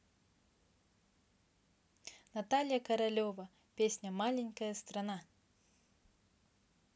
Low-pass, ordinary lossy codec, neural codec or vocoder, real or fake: none; none; none; real